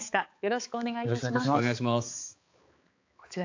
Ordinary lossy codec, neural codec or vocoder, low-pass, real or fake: AAC, 48 kbps; codec, 16 kHz, 4 kbps, X-Codec, HuBERT features, trained on balanced general audio; 7.2 kHz; fake